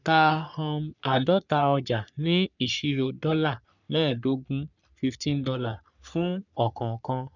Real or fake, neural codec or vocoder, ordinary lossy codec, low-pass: fake; codec, 44.1 kHz, 3.4 kbps, Pupu-Codec; none; 7.2 kHz